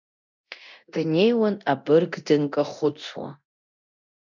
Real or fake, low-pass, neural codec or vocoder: fake; 7.2 kHz; codec, 24 kHz, 0.9 kbps, DualCodec